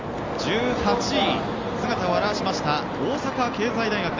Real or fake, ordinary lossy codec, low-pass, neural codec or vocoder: real; Opus, 32 kbps; 7.2 kHz; none